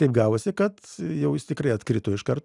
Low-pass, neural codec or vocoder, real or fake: 10.8 kHz; none; real